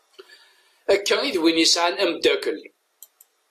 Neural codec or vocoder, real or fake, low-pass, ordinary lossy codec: none; real; 14.4 kHz; AAC, 64 kbps